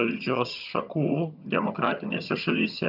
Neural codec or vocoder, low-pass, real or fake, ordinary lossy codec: vocoder, 22.05 kHz, 80 mel bands, HiFi-GAN; 5.4 kHz; fake; AAC, 48 kbps